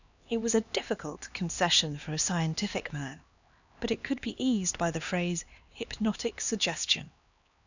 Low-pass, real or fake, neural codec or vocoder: 7.2 kHz; fake; codec, 16 kHz, 2 kbps, X-Codec, HuBERT features, trained on LibriSpeech